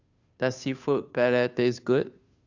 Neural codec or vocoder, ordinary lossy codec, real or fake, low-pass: codec, 16 kHz, 2 kbps, FunCodec, trained on Chinese and English, 25 frames a second; Opus, 64 kbps; fake; 7.2 kHz